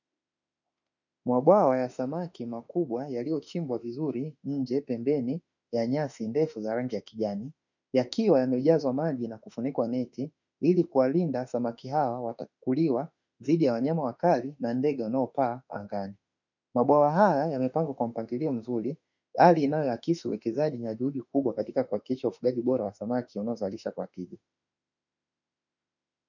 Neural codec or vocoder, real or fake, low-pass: autoencoder, 48 kHz, 32 numbers a frame, DAC-VAE, trained on Japanese speech; fake; 7.2 kHz